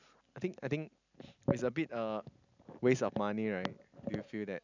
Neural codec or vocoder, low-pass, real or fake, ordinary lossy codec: none; 7.2 kHz; real; none